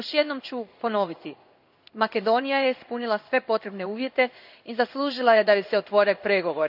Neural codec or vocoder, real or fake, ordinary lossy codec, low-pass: codec, 16 kHz in and 24 kHz out, 1 kbps, XY-Tokenizer; fake; none; 5.4 kHz